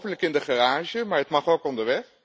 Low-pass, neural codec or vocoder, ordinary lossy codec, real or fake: none; none; none; real